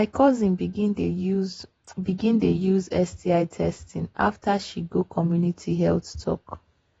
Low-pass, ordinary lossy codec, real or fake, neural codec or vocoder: 19.8 kHz; AAC, 24 kbps; fake; vocoder, 48 kHz, 128 mel bands, Vocos